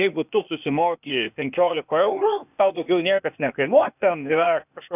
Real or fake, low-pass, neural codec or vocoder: fake; 3.6 kHz; codec, 16 kHz, 0.8 kbps, ZipCodec